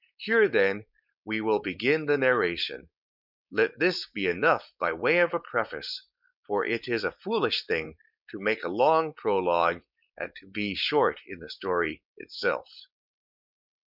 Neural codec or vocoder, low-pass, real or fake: codec, 16 kHz, 4.8 kbps, FACodec; 5.4 kHz; fake